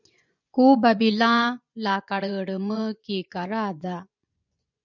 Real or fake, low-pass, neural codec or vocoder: fake; 7.2 kHz; vocoder, 24 kHz, 100 mel bands, Vocos